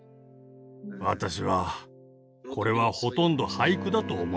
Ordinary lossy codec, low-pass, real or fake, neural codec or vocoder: none; none; real; none